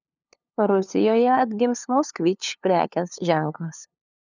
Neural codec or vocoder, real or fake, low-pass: codec, 16 kHz, 2 kbps, FunCodec, trained on LibriTTS, 25 frames a second; fake; 7.2 kHz